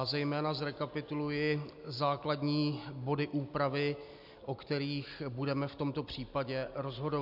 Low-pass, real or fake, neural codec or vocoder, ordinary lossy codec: 5.4 kHz; real; none; MP3, 48 kbps